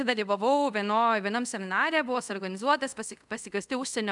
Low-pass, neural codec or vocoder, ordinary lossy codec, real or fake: 10.8 kHz; codec, 24 kHz, 0.5 kbps, DualCodec; MP3, 96 kbps; fake